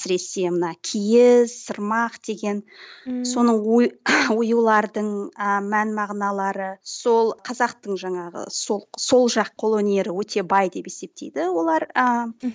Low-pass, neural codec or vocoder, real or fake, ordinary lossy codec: none; none; real; none